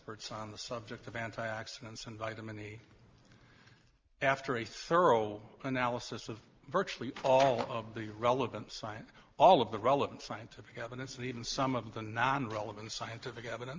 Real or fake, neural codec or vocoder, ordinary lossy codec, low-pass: real; none; Opus, 32 kbps; 7.2 kHz